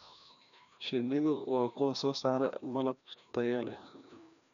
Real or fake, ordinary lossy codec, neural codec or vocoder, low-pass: fake; none; codec, 16 kHz, 1 kbps, FreqCodec, larger model; 7.2 kHz